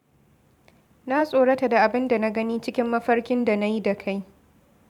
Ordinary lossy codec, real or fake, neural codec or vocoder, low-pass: none; fake; vocoder, 44.1 kHz, 128 mel bands every 512 samples, BigVGAN v2; 19.8 kHz